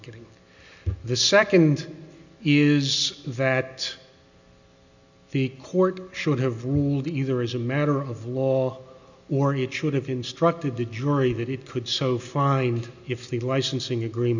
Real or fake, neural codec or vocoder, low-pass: real; none; 7.2 kHz